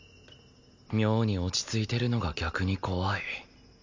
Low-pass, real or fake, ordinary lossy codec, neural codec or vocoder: 7.2 kHz; real; MP3, 64 kbps; none